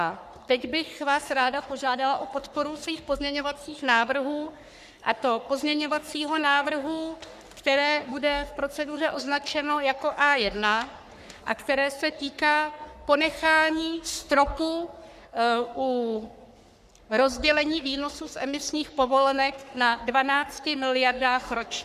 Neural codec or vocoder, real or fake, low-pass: codec, 44.1 kHz, 3.4 kbps, Pupu-Codec; fake; 14.4 kHz